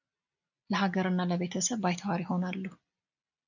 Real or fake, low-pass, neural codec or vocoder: real; 7.2 kHz; none